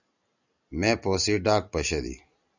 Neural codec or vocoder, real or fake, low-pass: none; real; 7.2 kHz